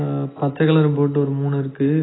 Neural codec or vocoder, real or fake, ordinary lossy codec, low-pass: none; real; AAC, 16 kbps; 7.2 kHz